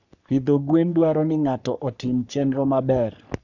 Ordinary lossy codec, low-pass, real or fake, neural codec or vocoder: none; 7.2 kHz; fake; codec, 44.1 kHz, 3.4 kbps, Pupu-Codec